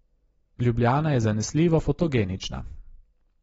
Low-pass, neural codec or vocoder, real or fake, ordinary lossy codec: 10.8 kHz; none; real; AAC, 24 kbps